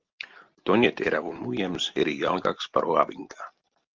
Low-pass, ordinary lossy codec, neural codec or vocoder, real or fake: 7.2 kHz; Opus, 32 kbps; none; real